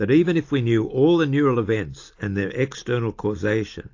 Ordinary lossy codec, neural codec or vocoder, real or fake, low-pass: AAC, 48 kbps; none; real; 7.2 kHz